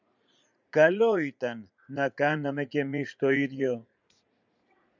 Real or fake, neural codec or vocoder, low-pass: fake; vocoder, 44.1 kHz, 80 mel bands, Vocos; 7.2 kHz